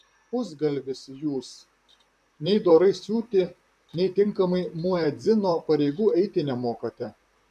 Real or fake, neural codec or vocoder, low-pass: fake; vocoder, 44.1 kHz, 128 mel bands, Pupu-Vocoder; 14.4 kHz